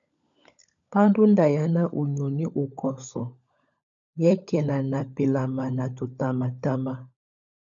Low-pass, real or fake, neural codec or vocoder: 7.2 kHz; fake; codec, 16 kHz, 16 kbps, FunCodec, trained on LibriTTS, 50 frames a second